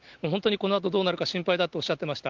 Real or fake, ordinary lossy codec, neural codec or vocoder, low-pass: real; Opus, 32 kbps; none; 7.2 kHz